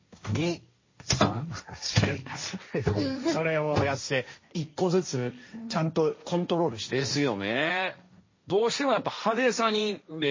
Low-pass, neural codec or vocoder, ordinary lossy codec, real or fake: 7.2 kHz; codec, 16 kHz, 1.1 kbps, Voila-Tokenizer; MP3, 32 kbps; fake